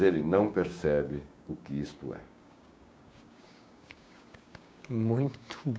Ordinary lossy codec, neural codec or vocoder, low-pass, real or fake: none; codec, 16 kHz, 6 kbps, DAC; none; fake